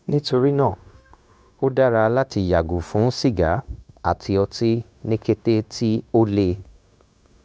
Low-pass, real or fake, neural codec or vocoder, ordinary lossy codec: none; fake; codec, 16 kHz, 0.9 kbps, LongCat-Audio-Codec; none